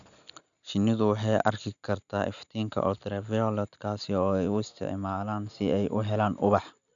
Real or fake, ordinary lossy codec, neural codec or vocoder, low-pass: real; none; none; 7.2 kHz